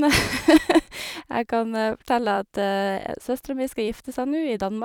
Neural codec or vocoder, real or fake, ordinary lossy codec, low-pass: vocoder, 44.1 kHz, 128 mel bands every 256 samples, BigVGAN v2; fake; none; 19.8 kHz